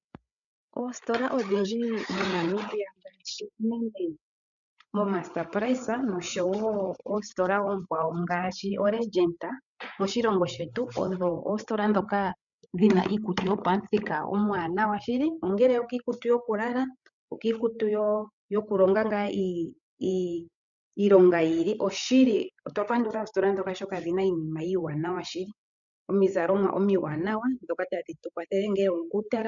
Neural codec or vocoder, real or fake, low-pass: codec, 16 kHz, 8 kbps, FreqCodec, larger model; fake; 7.2 kHz